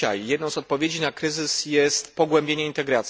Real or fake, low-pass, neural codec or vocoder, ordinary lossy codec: real; none; none; none